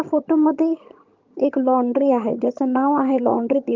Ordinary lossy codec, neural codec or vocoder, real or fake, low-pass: Opus, 24 kbps; vocoder, 22.05 kHz, 80 mel bands, HiFi-GAN; fake; 7.2 kHz